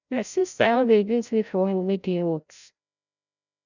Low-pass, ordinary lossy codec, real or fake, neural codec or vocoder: 7.2 kHz; none; fake; codec, 16 kHz, 0.5 kbps, FreqCodec, larger model